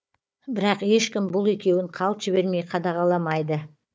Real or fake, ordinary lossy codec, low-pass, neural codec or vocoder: fake; none; none; codec, 16 kHz, 4 kbps, FunCodec, trained on Chinese and English, 50 frames a second